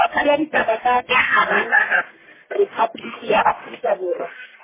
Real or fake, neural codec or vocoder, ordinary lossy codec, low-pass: fake; codec, 44.1 kHz, 1.7 kbps, Pupu-Codec; MP3, 16 kbps; 3.6 kHz